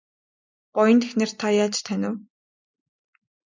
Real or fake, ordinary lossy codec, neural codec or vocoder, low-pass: real; MP3, 64 kbps; none; 7.2 kHz